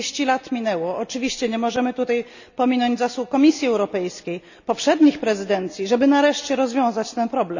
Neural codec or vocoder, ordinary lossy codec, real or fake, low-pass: none; none; real; 7.2 kHz